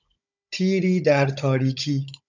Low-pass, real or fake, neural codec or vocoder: 7.2 kHz; fake; codec, 16 kHz, 16 kbps, FunCodec, trained on Chinese and English, 50 frames a second